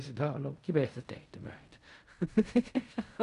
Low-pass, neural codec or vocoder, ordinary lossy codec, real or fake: 10.8 kHz; codec, 16 kHz in and 24 kHz out, 0.4 kbps, LongCat-Audio-Codec, fine tuned four codebook decoder; none; fake